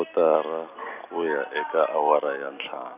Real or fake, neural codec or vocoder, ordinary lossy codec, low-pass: real; none; none; 3.6 kHz